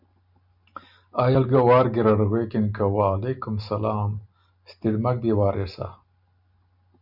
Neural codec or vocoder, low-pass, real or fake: none; 5.4 kHz; real